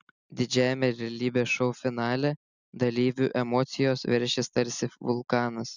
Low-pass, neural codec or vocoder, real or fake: 7.2 kHz; none; real